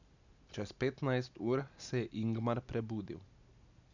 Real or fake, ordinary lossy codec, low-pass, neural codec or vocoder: real; none; 7.2 kHz; none